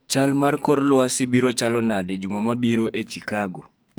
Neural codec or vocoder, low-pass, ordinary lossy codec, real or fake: codec, 44.1 kHz, 2.6 kbps, SNAC; none; none; fake